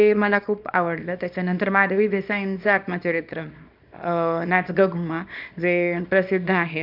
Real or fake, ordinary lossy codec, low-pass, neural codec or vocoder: fake; none; 5.4 kHz; codec, 24 kHz, 0.9 kbps, WavTokenizer, small release